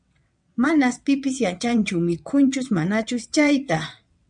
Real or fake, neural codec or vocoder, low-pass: fake; vocoder, 22.05 kHz, 80 mel bands, WaveNeXt; 9.9 kHz